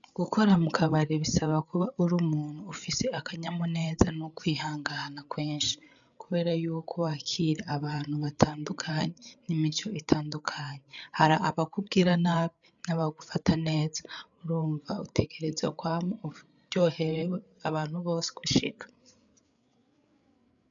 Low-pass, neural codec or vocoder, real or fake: 7.2 kHz; codec, 16 kHz, 8 kbps, FreqCodec, larger model; fake